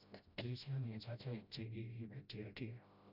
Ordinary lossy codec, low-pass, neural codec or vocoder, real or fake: none; 5.4 kHz; codec, 16 kHz, 0.5 kbps, FreqCodec, smaller model; fake